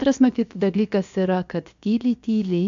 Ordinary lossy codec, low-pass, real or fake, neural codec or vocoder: MP3, 64 kbps; 7.2 kHz; fake; codec, 16 kHz, about 1 kbps, DyCAST, with the encoder's durations